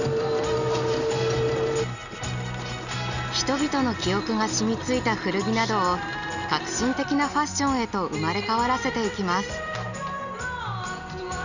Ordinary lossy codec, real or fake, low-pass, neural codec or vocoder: none; real; 7.2 kHz; none